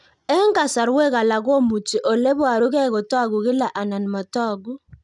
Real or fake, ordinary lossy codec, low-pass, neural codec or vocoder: real; none; 10.8 kHz; none